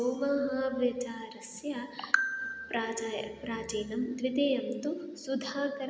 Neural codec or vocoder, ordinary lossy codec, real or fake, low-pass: none; none; real; none